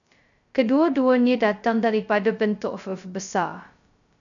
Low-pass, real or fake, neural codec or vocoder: 7.2 kHz; fake; codec, 16 kHz, 0.2 kbps, FocalCodec